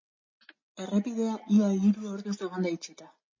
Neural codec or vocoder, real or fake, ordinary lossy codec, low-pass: none; real; MP3, 32 kbps; 7.2 kHz